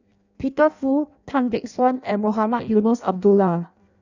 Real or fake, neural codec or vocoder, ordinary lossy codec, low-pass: fake; codec, 16 kHz in and 24 kHz out, 0.6 kbps, FireRedTTS-2 codec; none; 7.2 kHz